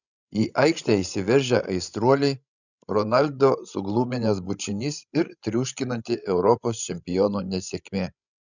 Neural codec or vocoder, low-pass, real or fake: codec, 16 kHz, 16 kbps, FreqCodec, larger model; 7.2 kHz; fake